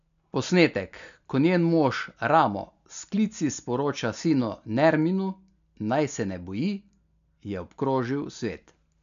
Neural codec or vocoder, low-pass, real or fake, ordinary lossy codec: none; 7.2 kHz; real; none